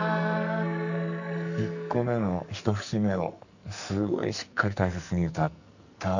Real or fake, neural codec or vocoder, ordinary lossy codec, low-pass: fake; codec, 44.1 kHz, 2.6 kbps, SNAC; none; 7.2 kHz